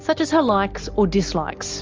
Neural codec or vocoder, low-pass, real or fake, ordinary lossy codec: none; 7.2 kHz; real; Opus, 24 kbps